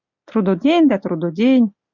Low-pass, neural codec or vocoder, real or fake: 7.2 kHz; none; real